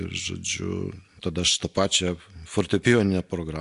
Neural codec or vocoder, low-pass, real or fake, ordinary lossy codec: none; 10.8 kHz; real; AAC, 64 kbps